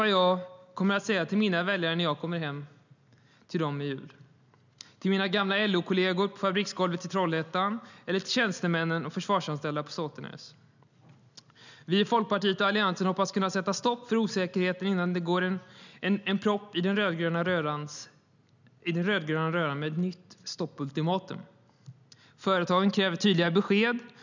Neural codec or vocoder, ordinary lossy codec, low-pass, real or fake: none; none; 7.2 kHz; real